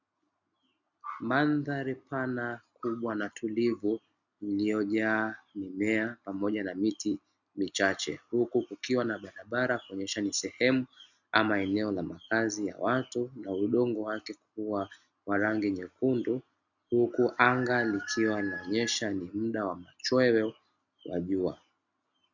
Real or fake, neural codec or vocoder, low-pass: real; none; 7.2 kHz